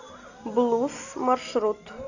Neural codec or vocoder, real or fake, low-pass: none; real; 7.2 kHz